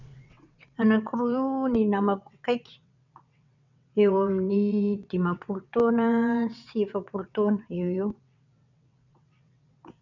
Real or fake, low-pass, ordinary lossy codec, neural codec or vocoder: fake; 7.2 kHz; none; vocoder, 22.05 kHz, 80 mel bands, WaveNeXt